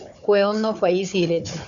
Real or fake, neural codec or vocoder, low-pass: fake; codec, 16 kHz, 4 kbps, FunCodec, trained on Chinese and English, 50 frames a second; 7.2 kHz